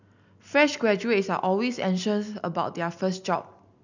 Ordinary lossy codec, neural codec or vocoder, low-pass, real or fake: none; none; 7.2 kHz; real